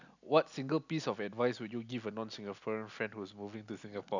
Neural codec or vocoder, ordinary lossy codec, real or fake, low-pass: none; none; real; 7.2 kHz